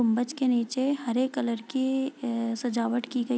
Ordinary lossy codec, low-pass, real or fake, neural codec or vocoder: none; none; real; none